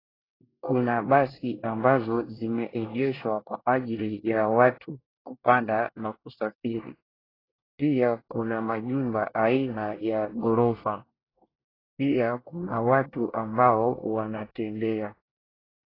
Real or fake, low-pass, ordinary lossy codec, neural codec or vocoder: fake; 5.4 kHz; AAC, 24 kbps; codec, 24 kHz, 1 kbps, SNAC